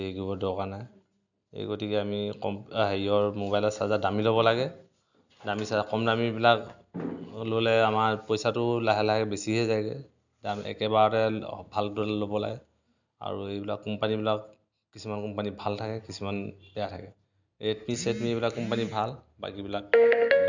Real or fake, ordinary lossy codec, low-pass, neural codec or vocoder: real; none; 7.2 kHz; none